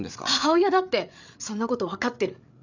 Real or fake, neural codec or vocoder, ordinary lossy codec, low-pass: fake; codec, 16 kHz, 8 kbps, FreqCodec, larger model; none; 7.2 kHz